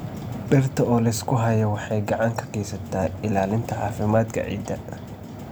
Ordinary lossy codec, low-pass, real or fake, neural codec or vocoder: none; none; real; none